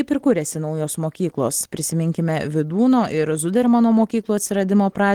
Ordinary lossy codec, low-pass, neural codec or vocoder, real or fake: Opus, 16 kbps; 19.8 kHz; none; real